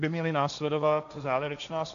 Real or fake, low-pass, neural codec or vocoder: fake; 7.2 kHz; codec, 16 kHz, 1.1 kbps, Voila-Tokenizer